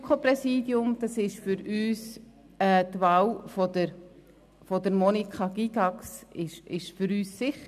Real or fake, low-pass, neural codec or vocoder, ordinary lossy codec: real; 14.4 kHz; none; none